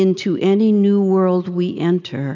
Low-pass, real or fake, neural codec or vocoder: 7.2 kHz; real; none